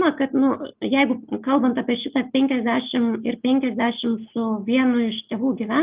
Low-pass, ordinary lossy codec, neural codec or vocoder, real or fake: 3.6 kHz; Opus, 24 kbps; none; real